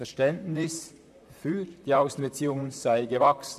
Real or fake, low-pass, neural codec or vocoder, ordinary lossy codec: fake; 14.4 kHz; vocoder, 44.1 kHz, 128 mel bands, Pupu-Vocoder; none